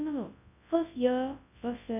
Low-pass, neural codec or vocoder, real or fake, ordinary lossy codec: 3.6 kHz; codec, 24 kHz, 0.9 kbps, WavTokenizer, large speech release; fake; none